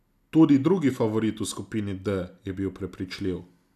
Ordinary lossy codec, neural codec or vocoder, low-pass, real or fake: none; none; 14.4 kHz; real